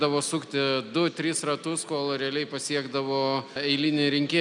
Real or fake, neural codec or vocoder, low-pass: real; none; 10.8 kHz